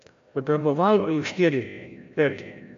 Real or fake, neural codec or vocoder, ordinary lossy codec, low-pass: fake; codec, 16 kHz, 0.5 kbps, FreqCodec, larger model; MP3, 64 kbps; 7.2 kHz